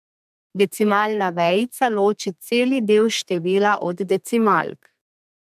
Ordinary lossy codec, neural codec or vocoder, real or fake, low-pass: MP3, 96 kbps; codec, 32 kHz, 1.9 kbps, SNAC; fake; 14.4 kHz